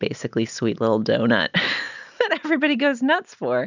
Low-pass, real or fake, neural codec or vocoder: 7.2 kHz; real; none